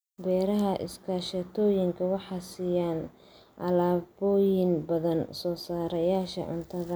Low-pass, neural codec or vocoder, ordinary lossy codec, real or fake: none; none; none; real